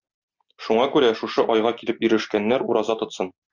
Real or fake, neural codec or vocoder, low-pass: real; none; 7.2 kHz